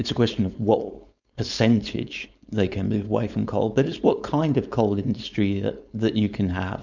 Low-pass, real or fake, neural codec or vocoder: 7.2 kHz; fake; codec, 16 kHz, 4.8 kbps, FACodec